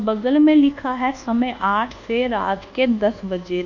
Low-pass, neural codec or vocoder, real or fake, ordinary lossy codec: 7.2 kHz; codec, 24 kHz, 1.2 kbps, DualCodec; fake; none